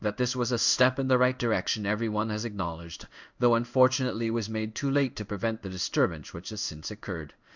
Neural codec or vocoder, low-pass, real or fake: codec, 16 kHz in and 24 kHz out, 1 kbps, XY-Tokenizer; 7.2 kHz; fake